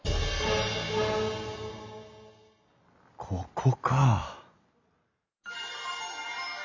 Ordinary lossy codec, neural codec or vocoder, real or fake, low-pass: MP3, 64 kbps; none; real; 7.2 kHz